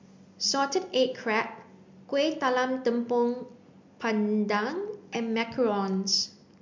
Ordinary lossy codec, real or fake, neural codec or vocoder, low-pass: MP3, 64 kbps; real; none; 7.2 kHz